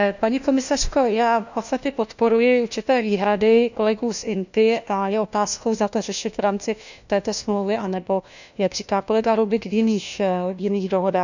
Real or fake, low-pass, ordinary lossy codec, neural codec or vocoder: fake; 7.2 kHz; none; codec, 16 kHz, 1 kbps, FunCodec, trained on LibriTTS, 50 frames a second